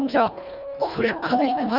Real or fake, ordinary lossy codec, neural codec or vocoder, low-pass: fake; none; codec, 24 kHz, 1.5 kbps, HILCodec; 5.4 kHz